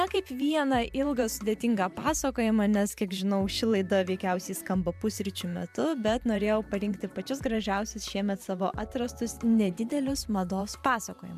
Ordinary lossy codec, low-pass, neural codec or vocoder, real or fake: MP3, 96 kbps; 14.4 kHz; vocoder, 44.1 kHz, 128 mel bands every 512 samples, BigVGAN v2; fake